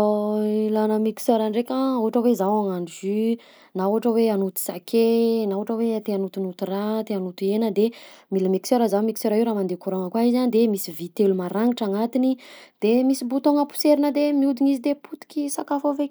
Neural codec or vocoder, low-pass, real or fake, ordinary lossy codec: none; none; real; none